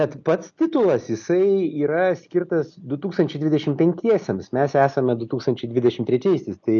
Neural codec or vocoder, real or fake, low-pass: none; real; 7.2 kHz